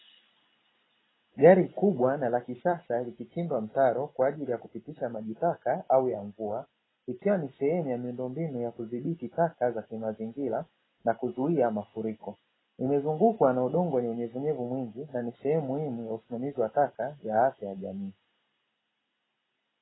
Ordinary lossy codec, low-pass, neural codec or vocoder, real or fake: AAC, 16 kbps; 7.2 kHz; none; real